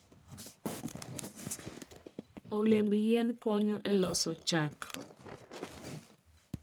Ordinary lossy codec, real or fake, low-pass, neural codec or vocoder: none; fake; none; codec, 44.1 kHz, 1.7 kbps, Pupu-Codec